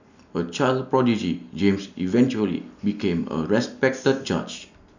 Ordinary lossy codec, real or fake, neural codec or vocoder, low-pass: none; real; none; 7.2 kHz